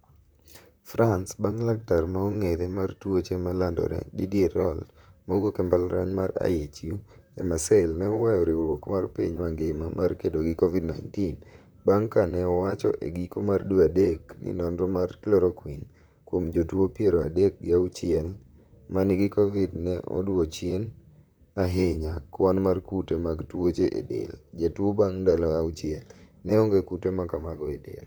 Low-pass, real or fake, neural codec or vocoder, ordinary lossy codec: none; fake; vocoder, 44.1 kHz, 128 mel bands, Pupu-Vocoder; none